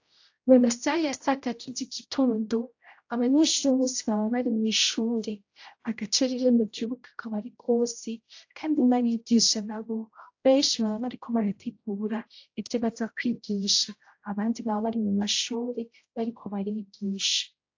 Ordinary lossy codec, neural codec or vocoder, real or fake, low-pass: AAC, 48 kbps; codec, 16 kHz, 0.5 kbps, X-Codec, HuBERT features, trained on general audio; fake; 7.2 kHz